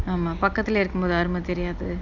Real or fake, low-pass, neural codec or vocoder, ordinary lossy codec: real; 7.2 kHz; none; Opus, 64 kbps